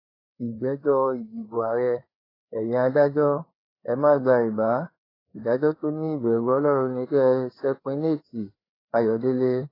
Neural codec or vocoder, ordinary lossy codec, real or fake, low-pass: codec, 16 kHz, 4 kbps, FreqCodec, larger model; AAC, 24 kbps; fake; 5.4 kHz